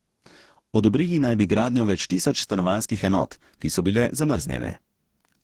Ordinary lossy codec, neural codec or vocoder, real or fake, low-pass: Opus, 16 kbps; codec, 44.1 kHz, 2.6 kbps, DAC; fake; 19.8 kHz